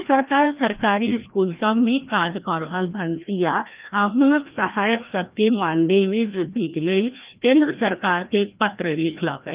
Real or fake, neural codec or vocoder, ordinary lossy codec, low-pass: fake; codec, 16 kHz, 1 kbps, FreqCodec, larger model; Opus, 32 kbps; 3.6 kHz